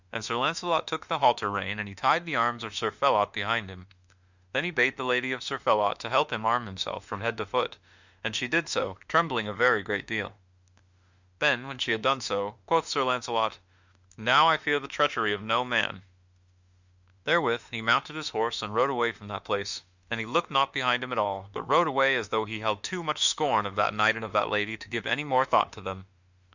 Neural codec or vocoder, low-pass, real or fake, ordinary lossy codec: autoencoder, 48 kHz, 32 numbers a frame, DAC-VAE, trained on Japanese speech; 7.2 kHz; fake; Opus, 64 kbps